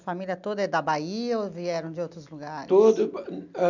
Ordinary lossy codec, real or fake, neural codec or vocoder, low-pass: none; real; none; 7.2 kHz